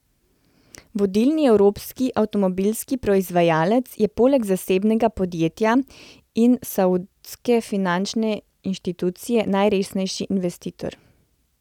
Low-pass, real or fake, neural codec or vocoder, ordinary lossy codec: 19.8 kHz; real; none; none